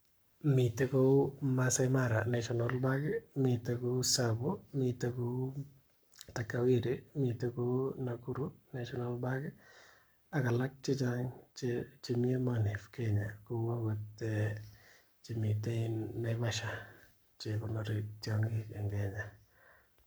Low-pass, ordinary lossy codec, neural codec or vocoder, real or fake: none; none; codec, 44.1 kHz, 7.8 kbps, Pupu-Codec; fake